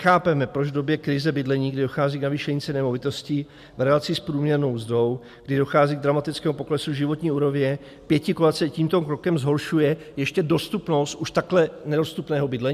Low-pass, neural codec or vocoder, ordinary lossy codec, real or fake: 14.4 kHz; none; MP3, 96 kbps; real